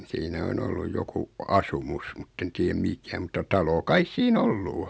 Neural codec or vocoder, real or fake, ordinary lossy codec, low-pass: none; real; none; none